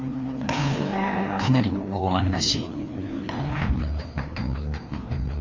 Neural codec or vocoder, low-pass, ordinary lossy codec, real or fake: codec, 16 kHz, 2 kbps, FreqCodec, larger model; 7.2 kHz; MP3, 48 kbps; fake